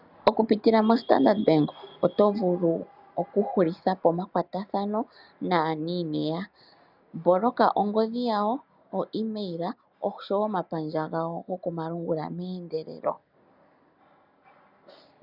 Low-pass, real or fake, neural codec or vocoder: 5.4 kHz; real; none